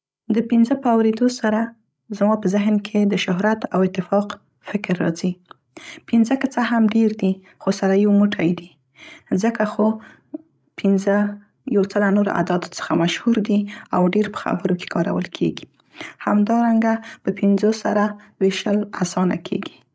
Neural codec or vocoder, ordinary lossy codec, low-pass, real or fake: codec, 16 kHz, 16 kbps, FreqCodec, larger model; none; none; fake